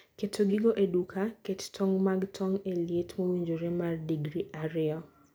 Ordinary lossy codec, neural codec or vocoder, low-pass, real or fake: none; none; none; real